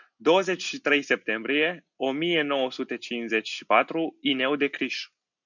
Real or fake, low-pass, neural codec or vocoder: real; 7.2 kHz; none